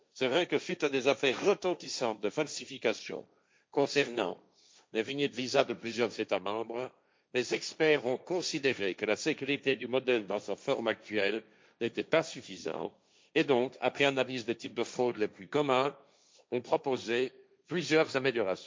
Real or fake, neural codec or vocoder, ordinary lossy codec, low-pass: fake; codec, 16 kHz, 1.1 kbps, Voila-Tokenizer; none; none